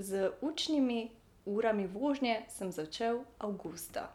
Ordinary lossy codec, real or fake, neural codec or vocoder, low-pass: none; real; none; 19.8 kHz